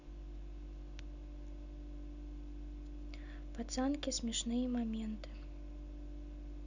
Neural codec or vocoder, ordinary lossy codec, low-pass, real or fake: none; none; 7.2 kHz; real